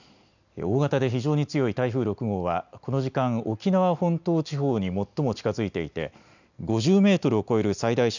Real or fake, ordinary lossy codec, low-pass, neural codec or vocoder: real; none; 7.2 kHz; none